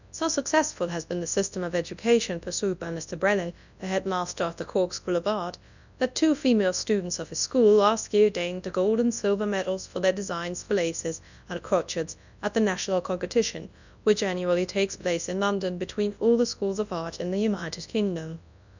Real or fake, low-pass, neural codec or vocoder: fake; 7.2 kHz; codec, 24 kHz, 0.9 kbps, WavTokenizer, large speech release